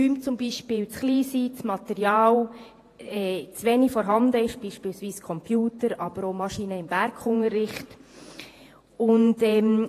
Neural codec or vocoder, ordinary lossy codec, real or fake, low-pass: vocoder, 48 kHz, 128 mel bands, Vocos; AAC, 48 kbps; fake; 14.4 kHz